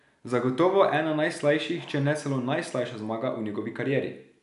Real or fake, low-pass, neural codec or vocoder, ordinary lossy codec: real; 10.8 kHz; none; MP3, 96 kbps